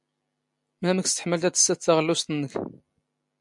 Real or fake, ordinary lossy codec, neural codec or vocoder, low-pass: real; MP3, 64 kbps; none; 10.8 kHz